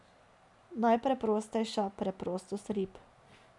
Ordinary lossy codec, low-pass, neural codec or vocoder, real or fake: none; 10.8 kHz; none; real